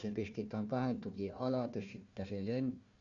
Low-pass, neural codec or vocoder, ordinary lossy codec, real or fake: 7.2 kHz; codec, 16 kHz, 1 kbps, FunCodec, trained on Chinese and English, 50 frames a second; Opus, 64 kbps; fake